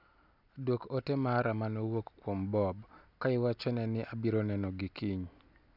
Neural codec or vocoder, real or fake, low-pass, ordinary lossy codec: none; real; 5.4 kHz; none